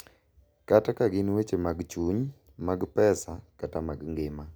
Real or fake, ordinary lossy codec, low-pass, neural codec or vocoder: real; none; none; none